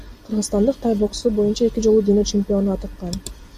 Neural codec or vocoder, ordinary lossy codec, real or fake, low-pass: none; AAC, 96 kbps; real; 14.4 kHz